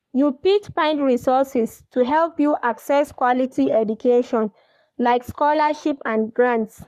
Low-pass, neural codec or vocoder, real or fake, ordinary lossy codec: 14.4 kHz; codec, 44.1 kHz, 3.4 kbps, Pupu-Codec; fake; none